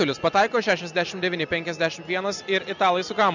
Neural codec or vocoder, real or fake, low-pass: none; real; 7.2 kHz